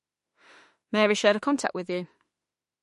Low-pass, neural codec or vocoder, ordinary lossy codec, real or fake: 14.4 kHz; autoencoder, 48 kHz, 32 numbers a frame, DAC-VAE, trained on Japanese speech; MP3, 48 kbps; fake